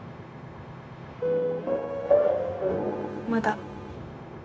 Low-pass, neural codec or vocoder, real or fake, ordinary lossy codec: none; codec, 16 kHz, 0.9 kbps, LongCat-Audio-Codec; fake; none